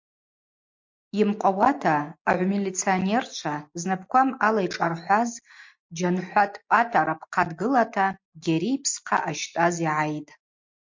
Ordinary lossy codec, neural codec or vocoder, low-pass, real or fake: MP3, 48 kbps; none; 7.2 kHz; real